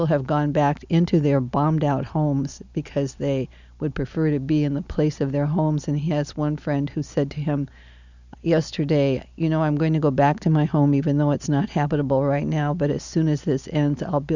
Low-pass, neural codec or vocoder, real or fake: 7.2 kHz; none; real